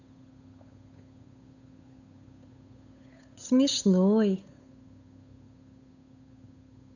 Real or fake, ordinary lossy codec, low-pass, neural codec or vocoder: fake; none; 7.2 kHz; codec, 16 kHz, 8 kbps, FunCodec, trained on Chinese and English, 25 frames a second